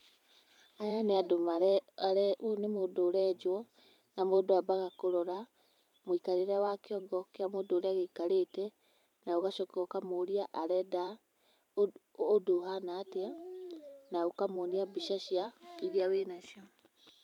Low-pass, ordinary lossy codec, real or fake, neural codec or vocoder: 19.8 kHz; none; fake; vocoder, 44.1 kHz, 128 mel bands every 512 samples, BigVGAN v2